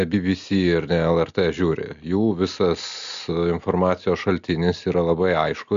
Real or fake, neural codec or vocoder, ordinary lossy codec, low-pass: real; none; MP3, 64 kbps; 7.2 kHz